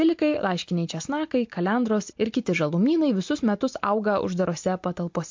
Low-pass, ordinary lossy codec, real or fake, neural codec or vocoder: 7.2 kHz; MP3, 48 kbps; real; none